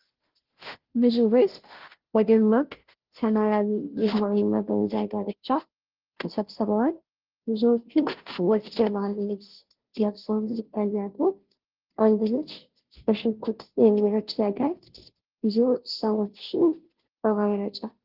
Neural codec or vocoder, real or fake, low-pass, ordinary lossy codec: codec, 16 kHz, 0.5 kbps, FunCodec, trained on Chinese and English, 25 frames a second; fake; 5.4 kHz; Opus, 16 kbps